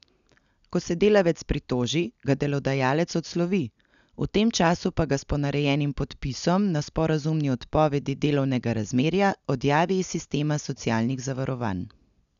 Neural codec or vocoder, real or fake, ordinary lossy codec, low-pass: none; real; none; 7.2 kHz